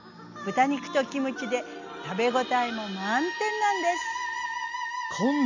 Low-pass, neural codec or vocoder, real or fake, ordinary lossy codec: 7.2 kHz; none; real; none